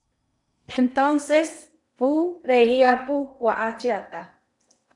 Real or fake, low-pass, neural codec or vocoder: fake; 10.8 kHz; codec, 16 kHz in and 24 kHz out, 0.8 kbps, FocalCodec, streaming, 65536 codes